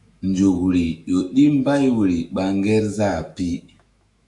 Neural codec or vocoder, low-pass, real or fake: autoencoder, 48 kHz, 128 numbers a frame, DAC-VAE, trained on Japanese speech; 10.8 kHz; fake